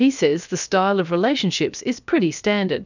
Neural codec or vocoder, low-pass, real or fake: codec, 16 kHz, about 1 kbps, DyCAST, with the encoder's durations; 7.2 kHz; fake